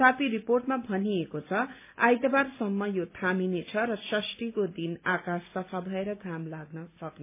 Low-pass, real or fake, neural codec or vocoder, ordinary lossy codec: 3.6 kHz; real; none; MP3, 24 kbps